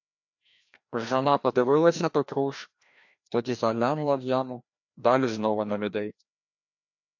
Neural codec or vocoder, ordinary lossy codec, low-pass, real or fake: codec, 16 kHz, 1 kbps, FreqCodec, larger model; MP3, 48 kbps; 7.2 kHz; fake